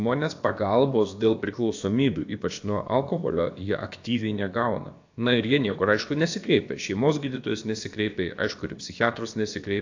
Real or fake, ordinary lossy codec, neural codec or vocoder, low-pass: fake; AAC, 48 kbps; codec, 16 kHz, about 1 kbps, DyCAST, with the encoder's durations; 7.2 kHz